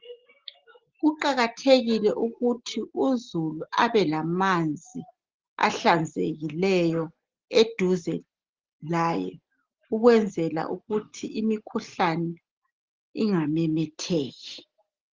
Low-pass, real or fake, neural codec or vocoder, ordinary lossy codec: 7.2 kHz; real; none; Opus, 16 kbps